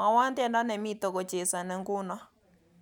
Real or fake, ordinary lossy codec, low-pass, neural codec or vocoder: real; none; 19.8 kHz; none